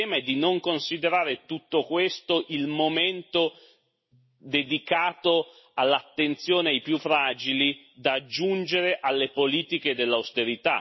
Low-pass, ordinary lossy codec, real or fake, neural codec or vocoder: 7.2 kHz; MP3, 24 kbps; real; none